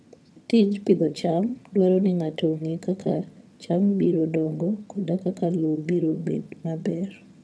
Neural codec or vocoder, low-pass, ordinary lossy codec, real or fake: vocoder, 22.05 kHz, 80 mel bands, HiFi-GAN; none; none; fake